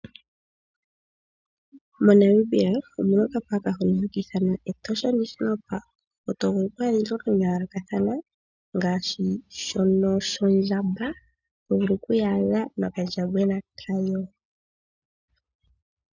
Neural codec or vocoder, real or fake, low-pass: none; real; 7.2 kHz